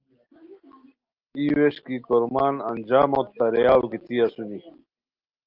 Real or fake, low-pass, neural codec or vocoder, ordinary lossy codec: real; 5.4 kHz; none; Opus, 32 kbps